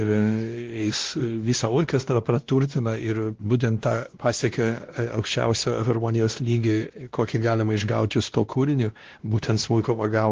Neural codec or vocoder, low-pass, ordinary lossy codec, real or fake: codec, 16 kHz, 1 kbps, X-Codec, WavLM features, trained on Multilingual LibriSpeech; 7.2 kHz; Opus, 16 kbps; fake